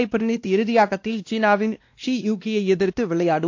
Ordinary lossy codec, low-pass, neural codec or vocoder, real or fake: AAC, 48 kbps; 7.2 kHz; codec, 16 kHz, 1 kbps, X-Codec, WavLM features, trained on Multilingual LibriSpeech; fake